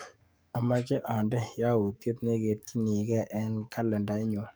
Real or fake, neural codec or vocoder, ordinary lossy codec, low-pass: fake; codec, 44.1 kHz, 7.8 kbps, DAC; none; none